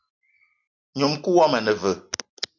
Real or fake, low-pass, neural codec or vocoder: real; 7.2 kHz; none